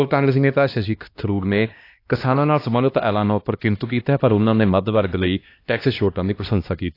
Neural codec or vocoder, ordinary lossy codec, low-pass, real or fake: codec, 16 kHz, 1 kbps, X-Codec, HuBERT features, trained on LibriSpeech; AAC, 32 kbps; 5.4 kHz; fake